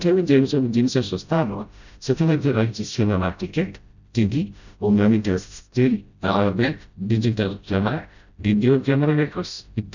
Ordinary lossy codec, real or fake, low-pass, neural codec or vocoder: none; fake; 7.2 kHz; codec, 16 kHz, 0.5 kbps, FreqCodec, smaller model